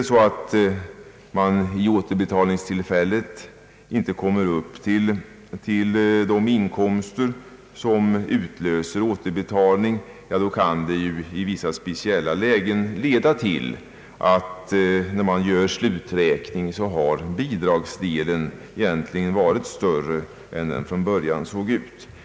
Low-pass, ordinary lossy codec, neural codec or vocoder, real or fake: none; none; none; real